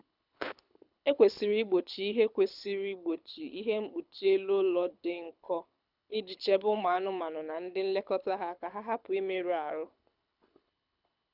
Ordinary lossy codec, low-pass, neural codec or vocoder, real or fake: none; 5.4 kHz; codec, 24 kHz, 6 kbps, HILCodec; fake